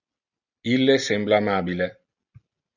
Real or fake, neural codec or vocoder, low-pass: real; none; 7.2 kHz